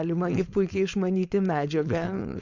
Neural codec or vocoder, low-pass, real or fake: codec, 16 kHz, 4.8 kbps, FACodec; 7.2 kHz; fake